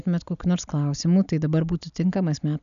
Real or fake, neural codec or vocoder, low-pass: real; none; 7.2 kHz